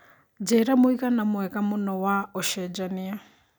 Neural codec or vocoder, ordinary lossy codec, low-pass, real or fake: none; none; none; real